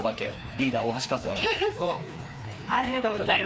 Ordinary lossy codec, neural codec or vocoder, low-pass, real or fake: none; codec, 16 kHz, 2 kbps, FreqCodec, larger model; none; fake